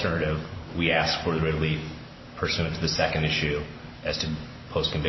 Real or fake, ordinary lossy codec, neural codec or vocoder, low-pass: real; MP3, 24 kbps; none; 7.2 kHz